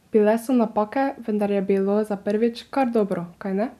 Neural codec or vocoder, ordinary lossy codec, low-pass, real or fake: none; none; 14.4 kHz; real